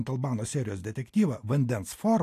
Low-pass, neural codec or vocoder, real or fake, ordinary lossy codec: 14.4 kHz; none; real; AAC, 64 kbps